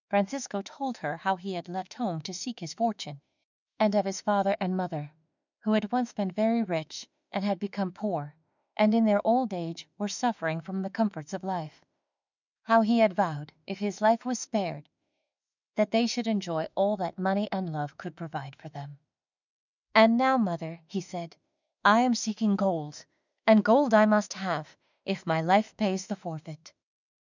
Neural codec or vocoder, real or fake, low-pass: autoencoder, 48 kHz, 32 numbers a frame, DAC-VAE, trained on Japanese speech; fake; 7.2 kHz